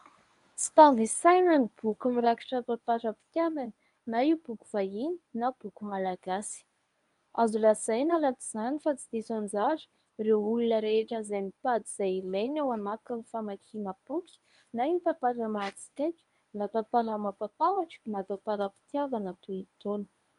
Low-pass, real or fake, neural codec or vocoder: 10.8 kHz; fake; codec, 24 kHz, 0.9 kbps, WavTokenizer, medium speech release version 1